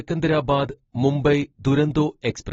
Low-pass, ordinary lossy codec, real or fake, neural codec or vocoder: 7.2 kHz; AAC, 24 kbps; real; none